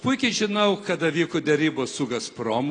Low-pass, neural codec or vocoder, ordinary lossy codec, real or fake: 9.9 kHz; none; AAC, 32 kbps; real